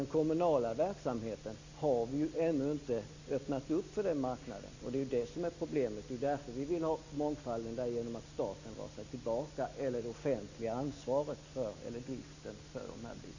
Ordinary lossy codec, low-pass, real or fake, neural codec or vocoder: Opus, 64 kbps; 7.2 kHz; real; none